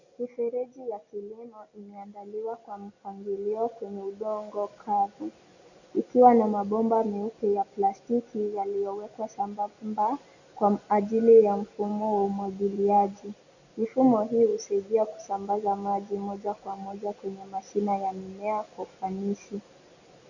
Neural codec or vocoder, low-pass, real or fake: none; 7.2 kHz; real